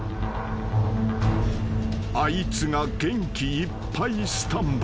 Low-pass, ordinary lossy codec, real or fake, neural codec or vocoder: none; none; real; none